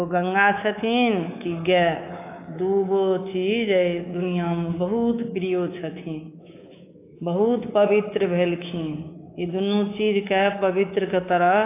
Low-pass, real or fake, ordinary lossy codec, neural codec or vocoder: 3.6 kHz; fake; AAC, 24 kbps; codec, 24 kHz, 3.1 kbps, DualCodec